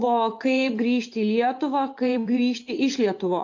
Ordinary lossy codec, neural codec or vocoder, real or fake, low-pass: AAC, 48 kbps; vocoder, 24 kHz, 100 mel bands, Vocos; fake; 7.2 kHz